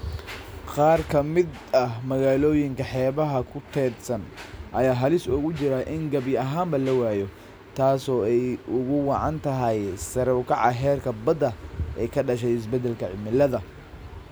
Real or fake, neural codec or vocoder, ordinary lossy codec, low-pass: real; none; none; none